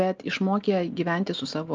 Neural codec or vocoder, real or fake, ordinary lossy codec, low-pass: none; real; Opus, 24 kbps; 7.2 kHz